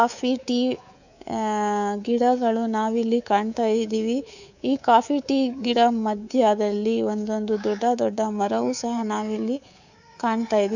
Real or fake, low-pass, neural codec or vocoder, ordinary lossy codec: fake; 7.2 kHz; codec, 24 kHz, 3.1 kbps, DualCodec; none